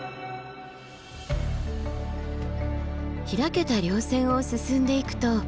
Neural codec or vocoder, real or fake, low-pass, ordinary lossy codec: none; real; none; none